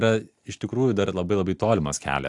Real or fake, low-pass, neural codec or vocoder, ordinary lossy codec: real; 10.8 kHz; none; AAC, 64 kbps